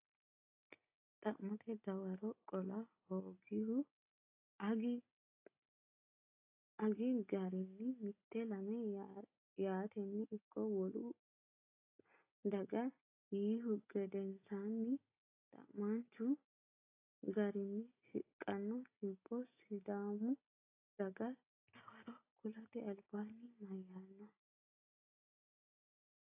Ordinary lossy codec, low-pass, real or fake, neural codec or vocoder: AAC, 24 kbps; 3.6 kHz; real; none